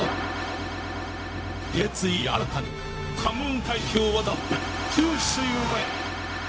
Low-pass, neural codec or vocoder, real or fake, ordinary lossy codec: none; codec, 16 kHz, 0.4 kbps, LongCat-Audio-Codec; fake; none